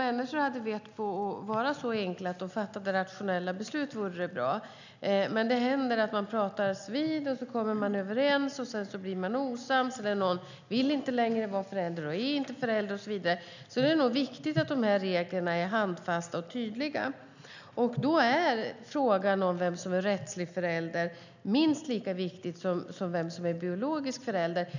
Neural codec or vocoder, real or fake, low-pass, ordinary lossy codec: none; real; 7.2 kHz; none